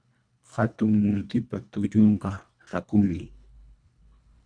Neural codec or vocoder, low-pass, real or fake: codec, 24 kHz, 1.5 kbps, HILCodec; 9.9 kHz; fake